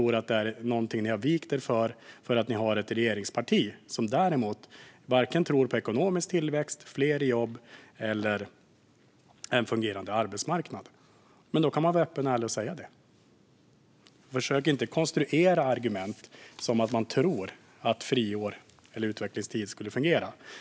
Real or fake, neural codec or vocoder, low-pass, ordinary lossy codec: real; none; none; none